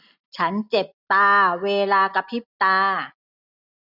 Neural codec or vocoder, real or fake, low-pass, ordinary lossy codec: none; real; 5.4 kHz; none